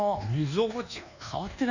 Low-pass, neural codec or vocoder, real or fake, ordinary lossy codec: 7.2 kHz; codec, 24 kHz, 1.2 kbps, DualCodec; fake; none